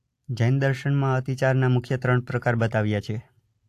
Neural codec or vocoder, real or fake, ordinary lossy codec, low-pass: none; real; AAC, 64 kbps; 14.4 kHz